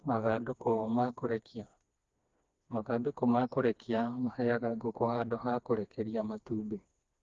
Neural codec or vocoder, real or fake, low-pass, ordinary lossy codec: codec, 16 kHz, 2 kbps, FreqCodec, smaller model; fake; 7.2 kHz; Opus, 32 kbps